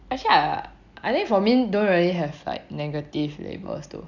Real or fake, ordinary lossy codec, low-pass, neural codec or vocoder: real; none; 7.2 kHz; none